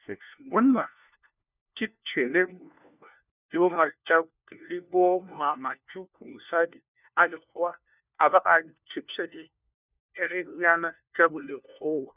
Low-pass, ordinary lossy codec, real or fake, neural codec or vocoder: 3.6 kHz; none; fake; codec, 16 kHz, 1 kbps, FunCodec, trained on LibriTTS, 50 frames a second